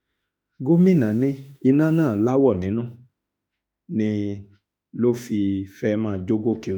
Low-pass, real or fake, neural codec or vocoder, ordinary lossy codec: none; fake; autoencoder, 48 kHz, 32 numbers a frame, DAC-VAE, trained on Japanese speech; none